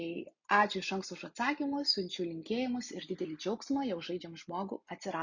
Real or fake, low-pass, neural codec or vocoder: real; 7.2 kHz; none